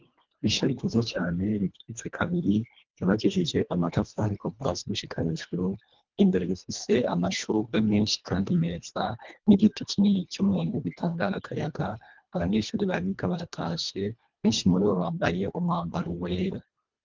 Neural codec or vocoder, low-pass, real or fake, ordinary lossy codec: codec, 24 kHz, 1.5 kbps, HILCodec; 7.2 kHz; fake; Opus, 24 kbps